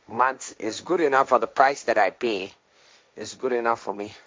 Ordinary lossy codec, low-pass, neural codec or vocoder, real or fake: none; none; codec, 16 kHz, 1.1 kbps, Voila-Tokenizer; fake